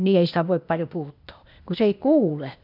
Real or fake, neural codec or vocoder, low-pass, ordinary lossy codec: fake; codec, 16 kHz, 0.8 kbps, ZipCodec; 5.4 kHz; none